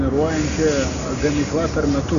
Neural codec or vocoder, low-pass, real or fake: none; 7.2 kHz; real